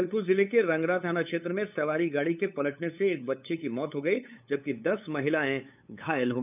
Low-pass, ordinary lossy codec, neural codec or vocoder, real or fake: 3.6 kHz; none; codec, 16 kHz, 8 kbps, FunCodec, trained on LibriTTS, 25 frames a second; fake